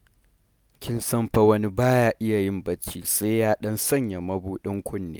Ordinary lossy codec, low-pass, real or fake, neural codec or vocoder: none; none; real; none